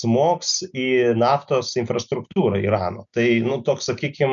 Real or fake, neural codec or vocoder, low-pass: real; none; 7.2 kHz